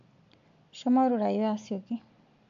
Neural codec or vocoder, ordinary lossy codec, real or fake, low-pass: none; AAC, 64 kbps; real; 7.2 kHz